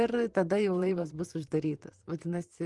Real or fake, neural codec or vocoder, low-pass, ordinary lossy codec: fake; vocoder, 44.1 kHz, 128 mel bands, Pupu-Vocoder; 10.8 kHz; Opus, 24 kbps